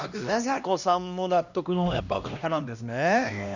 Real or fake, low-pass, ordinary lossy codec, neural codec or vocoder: fake; 7.2 kHz; none; codec, 16 kHz, 1 kbps, X-Codec, HuBERT features, trained on LibriSpeech